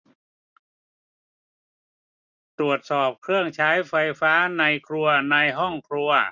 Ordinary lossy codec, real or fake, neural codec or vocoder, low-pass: none; real; none; 7.2 kHz